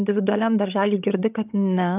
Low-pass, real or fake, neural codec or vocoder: 3.6 kHz; fake; codec, 16 kHz, 16 kbps, FreqCodec, larger model